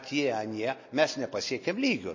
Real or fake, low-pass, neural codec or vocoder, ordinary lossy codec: real; 7.2 kHz; none; MP3, 32 kbps